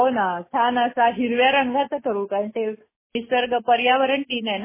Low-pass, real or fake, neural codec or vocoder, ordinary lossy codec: 3.6 kHz; real; none; MP3, 16 kbps